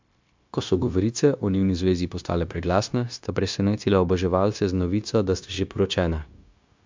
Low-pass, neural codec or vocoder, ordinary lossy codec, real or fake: 7.2 kHz; codec, 16 kHz, 0.9 kbps, LongCat-Audio-Codec; MP3, 64 kbps; fake